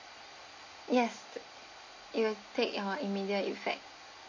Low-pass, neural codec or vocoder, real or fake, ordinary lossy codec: 7.2 kHz; none; real; MP3, 32 kbps